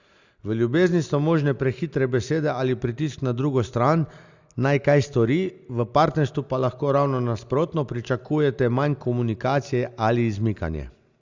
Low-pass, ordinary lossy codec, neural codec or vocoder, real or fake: 7.2 kHz; Opus, 64 kbps; none; real